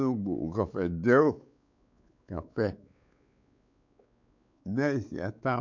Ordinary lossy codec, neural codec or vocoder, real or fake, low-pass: none; codec, 16 kHz, 4 kbps, X-Codec, WavLM features, trained on Multilingual LibriSpeech; fake; 7.2 kHz